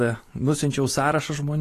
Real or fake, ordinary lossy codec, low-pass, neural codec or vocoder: real; AAC, 48 kbps; 14.4 kHz; none